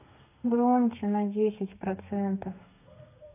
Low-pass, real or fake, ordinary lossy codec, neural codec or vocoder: 3.6 kHz; fake; AAC, 24 kbps; codec, 32 kHz, 1.9 kbps, SNAC